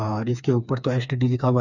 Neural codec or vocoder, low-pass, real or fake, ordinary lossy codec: codec, 16 kHz, 4 kbps, FreqCodec, smaller model; 7.2 kHz; fake; none